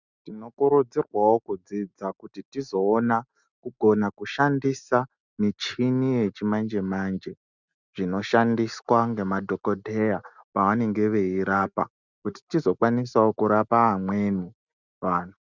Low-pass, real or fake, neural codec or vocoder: 7.2 kHz; real; none